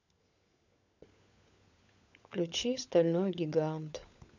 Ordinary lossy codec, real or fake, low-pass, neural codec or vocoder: none; fake; 7.2 kHz; codec, 16 kHz, 16 kbps, FunCodec, trained on LibriTTS, 50 frames a second